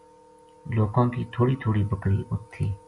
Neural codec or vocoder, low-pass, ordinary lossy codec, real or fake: none; 10.8 kHz; MP3, 96 kbps; real